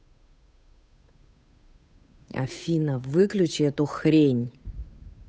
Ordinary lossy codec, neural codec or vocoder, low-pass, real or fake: none; codec, 16 kHz, 8 kbps, FunCodec, trained on Chinese and English, 25 frames a second; none; fake